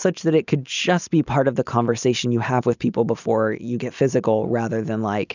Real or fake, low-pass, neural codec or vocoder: real; 7.2 kHz; none